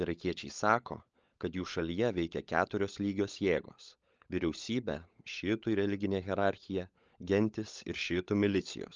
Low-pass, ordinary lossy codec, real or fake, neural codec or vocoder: 7.2 kHz; Opus, 24 kbps; fake; codec, 16 kHz, 16 kbps, FunCodec, trained on LibriTTS, 50 frames a second